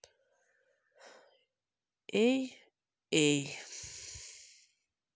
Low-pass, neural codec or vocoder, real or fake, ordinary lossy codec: none; none; real; none